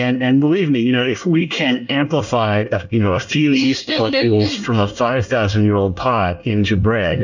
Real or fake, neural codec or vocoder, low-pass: fake; codec, 24 kHz, 1 kbps, SNAC; 7.2 kHz